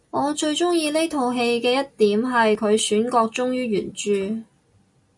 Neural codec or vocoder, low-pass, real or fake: none; 10.8 kHz; real